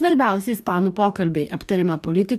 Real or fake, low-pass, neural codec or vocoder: fake; 14.4 kHz; codec, 44.1 kHz, 2.6 kbps, DAC